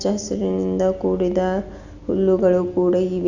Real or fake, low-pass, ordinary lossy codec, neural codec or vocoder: real; 7.2 kHz; none; none